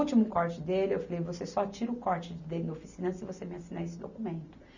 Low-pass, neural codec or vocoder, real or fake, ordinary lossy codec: 7.2 kHz; none; real; none